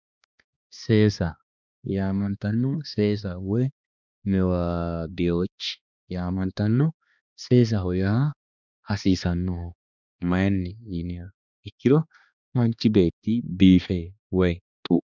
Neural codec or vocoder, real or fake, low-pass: codec, 16 kHz, 2 kbps, X-Codec, HuBERT features, trained on balanced general audio; fake; 7.2 kHz